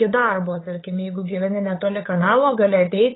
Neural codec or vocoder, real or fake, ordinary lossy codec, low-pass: codec, 16 kHz, 8 kbps, FunCodec, trained on LibriTTS, 25 frames a second; fake; AAC, 16 kbps; 7.2 kHz